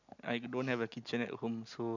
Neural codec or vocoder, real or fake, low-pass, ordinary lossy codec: none; real; 7.2 kHz; none